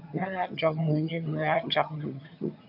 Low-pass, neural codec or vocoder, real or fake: 5.4 kHz; vocoder, 22.05 kHz, 80 mel bands, HiFi-GAN; fake